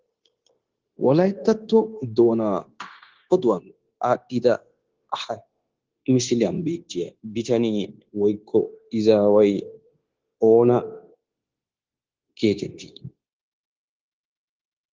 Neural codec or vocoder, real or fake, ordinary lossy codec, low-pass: codec, 16 kHz, 0.9 kbps, LongCat-Audio-Codec; fake; Opus, 16 kbps; 7.2 kHz